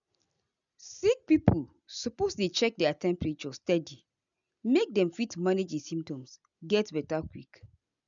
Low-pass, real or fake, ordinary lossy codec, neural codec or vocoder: 7.2 kHz; real; none; none